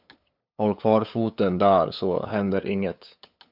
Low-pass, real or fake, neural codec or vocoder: 5.4 kHz; fake; codec, 16 kHz, 8 kbps, FunCodec, trained on LibriTTS, 25 frames a second